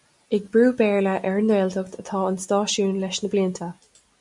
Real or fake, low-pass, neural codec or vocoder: real; 10.8 kHz; none